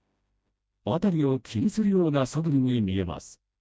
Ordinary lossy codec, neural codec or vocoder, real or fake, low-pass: none; codec, 16 kHz, 1 kbps, FreqCodec, smaller model; fake; none